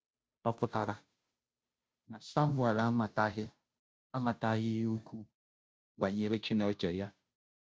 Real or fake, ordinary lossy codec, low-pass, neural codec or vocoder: fake; none; none; codec, 16 kHz, 0.5 kbps, FunCodec, trained on Chinese and English, 25 frames a second